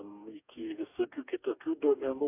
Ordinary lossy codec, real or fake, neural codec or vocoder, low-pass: AAC, 32 kbps; fake; codec, 44.1 kHz, 2.6 kbps, DAC; 3.6 kHz